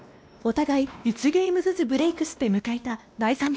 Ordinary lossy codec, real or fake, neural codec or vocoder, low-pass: none; fake; codec, 16 kHz, 1 kbps, X-Codec, WavLM features, trained on Multilingual LibriSpeech; none